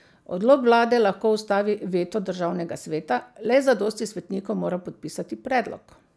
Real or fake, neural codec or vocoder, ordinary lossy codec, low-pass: real; none; none; none